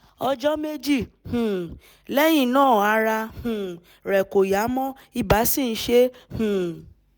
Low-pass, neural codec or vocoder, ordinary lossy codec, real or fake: none; none; none; real